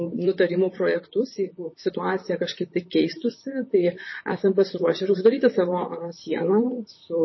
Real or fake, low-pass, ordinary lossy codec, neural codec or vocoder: fake; 7.2 kHz; MP3, 24 kbps; vocoder, 44.1 kHz, 128 mel bands, Pupu-Vocoder